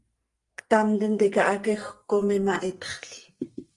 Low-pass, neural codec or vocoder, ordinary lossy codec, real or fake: 10.8 kHz; codec, 44.1 kHz, 2.6 kbps, SNAC; Opus, 24 kbps; fake